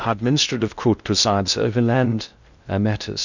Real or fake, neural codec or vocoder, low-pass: fake; codec, 16 kHz in and 24 kHz out, 0.6 kbps, FocalCodec, streaming, 2048 codes; 7.2 kHz